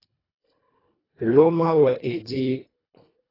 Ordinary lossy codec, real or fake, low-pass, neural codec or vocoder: AAC, 24 kbps; fake; 5.4 kHz; codec, 24 kHz, 1.5 kbps, HILCodec